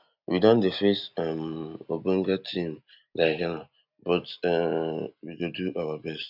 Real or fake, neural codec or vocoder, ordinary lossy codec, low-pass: real; none; none; 5.4 kHz